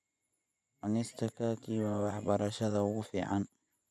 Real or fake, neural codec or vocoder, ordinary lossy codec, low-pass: fake; vocoder, 24 kHz, 100 mel bands, Vocos; none; none